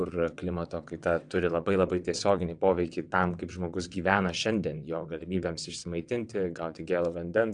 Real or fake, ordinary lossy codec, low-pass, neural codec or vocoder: fake; AAC, 64 kbps; 9.9 kHz; vocoder, 22.05 kHz, 80 mel bands, WaveNeXt